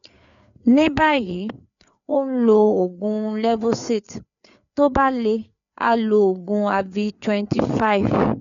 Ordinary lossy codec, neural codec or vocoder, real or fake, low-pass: none; codec, 16 kHz, 4 kbps, FreqCodec, larger model; fake; 7.2 kHz